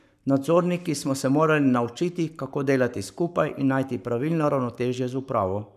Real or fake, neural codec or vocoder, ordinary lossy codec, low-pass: fake; codec, 44.1 kHz, 7.8 kbps, Pupu-Codec; none; 14.4 kHz